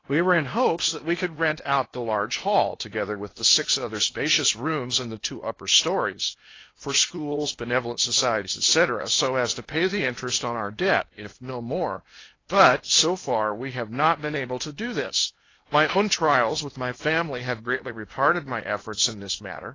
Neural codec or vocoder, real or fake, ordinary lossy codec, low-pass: codec, 16 kHz in and 24 kHz out, 0.8 kbps, FocalCodec, streaming, 65536 codes; fake; AAC, 32 kbps; 7.2 kHz